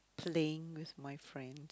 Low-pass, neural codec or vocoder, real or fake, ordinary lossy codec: none; none; real; none